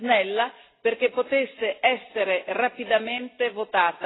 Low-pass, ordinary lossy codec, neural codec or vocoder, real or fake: 7.2 kHz; AAC, 16 kbps; none; real